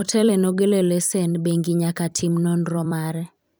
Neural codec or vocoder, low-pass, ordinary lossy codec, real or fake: vocoder, 44.1 kHz, 128 mel bands every 256 samples, BigVGAN v2; none; none; fake